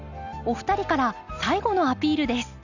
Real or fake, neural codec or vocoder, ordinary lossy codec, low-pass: real; none; none; 7.2 kHz